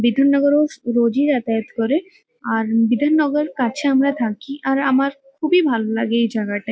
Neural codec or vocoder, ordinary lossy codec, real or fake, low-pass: none; none; real; none